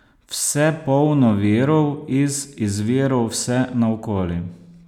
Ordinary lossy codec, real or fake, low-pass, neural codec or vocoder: none; real; 19.8 kHz; none